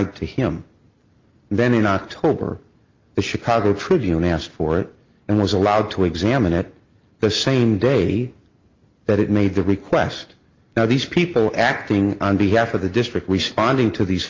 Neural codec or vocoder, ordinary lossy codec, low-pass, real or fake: none; Opus, 24 kbps; 7.2 kHz; real